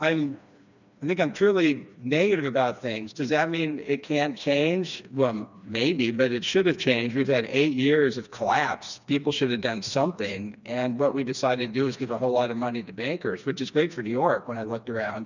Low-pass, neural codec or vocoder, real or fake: 7.2 kHz; codec, 16 kHz, 2 kbps, FreqCodec, smaller model; fake